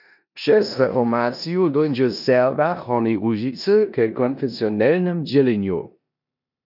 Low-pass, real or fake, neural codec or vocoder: 5.4 kHz; fake; codec, 16 kHz in and 24 kHz out, 0.9 kbps, LongCat-Audio-Codec, four codebook decoder